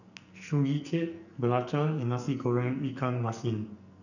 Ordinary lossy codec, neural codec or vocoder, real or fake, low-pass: none; codec, 44.1 kHz, 2.6 kbps, SNAC; fake; 7.2 kHz